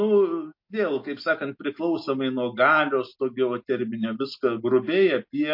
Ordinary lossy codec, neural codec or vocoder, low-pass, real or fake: MP3, 32 kbps; none; 5.4 kHz; real